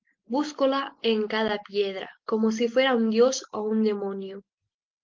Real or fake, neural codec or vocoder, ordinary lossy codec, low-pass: real; none; Opus, 32 kbps; 7.2 kHz